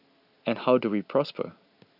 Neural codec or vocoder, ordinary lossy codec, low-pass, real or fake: none; none; 5.4 kHz; real